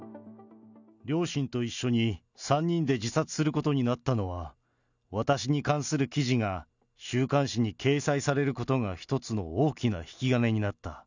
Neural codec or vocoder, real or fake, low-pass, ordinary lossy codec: none; real; 7.2 kHz; none